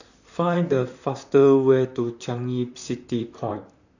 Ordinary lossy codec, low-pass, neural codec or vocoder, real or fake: none; 7.2 kHz; vocoder, 44.1 kHz, 128 mel bands, Pupu-Vocoder; fake